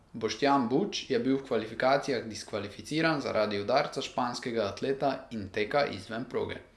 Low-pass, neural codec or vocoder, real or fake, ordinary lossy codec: none; none; real; none